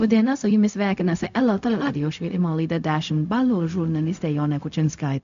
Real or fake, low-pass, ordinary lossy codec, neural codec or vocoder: fake; 7.2 kHz; MP3, 64 kbps; codec, 16 kHz, 0.4 kbps, LongCat-Audio-Codec